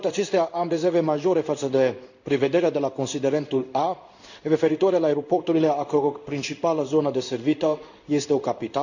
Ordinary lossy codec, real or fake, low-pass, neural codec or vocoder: none; fake; 7.2 kHz; codec, 16 kHz in and 24 kHz out, 1 kbps, XY-Tokenizer